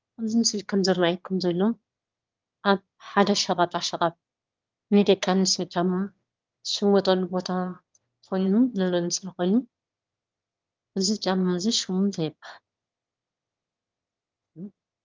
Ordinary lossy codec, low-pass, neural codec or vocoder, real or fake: Opus, 32 kbps; 7.2 kHz; autoencoder, 22.05 kHz, a latent of 192 numbers a frame, VITS, trained on one speaker; fake